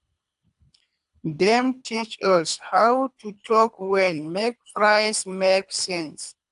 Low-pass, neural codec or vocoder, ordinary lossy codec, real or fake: 10.8 kHz; codec, 24 kHz, 3 kbps, HILCodec; AAC, 96 kbps; fake